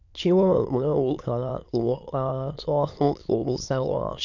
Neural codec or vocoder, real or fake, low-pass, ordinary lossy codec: autoencoder, 22.05 kHz, a latent of 192 numbers a frame, VITS, trained on many speakers; fake; 7.2 kHz; none